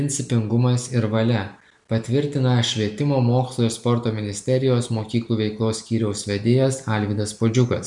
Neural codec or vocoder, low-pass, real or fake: none; 10.8 kHz; real